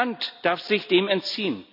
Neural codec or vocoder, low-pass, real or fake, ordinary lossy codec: none; 5.4 kHz; real; none